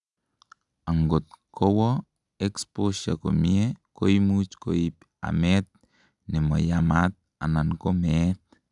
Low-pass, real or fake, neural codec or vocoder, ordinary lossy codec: 10.8 kHz; real; none; none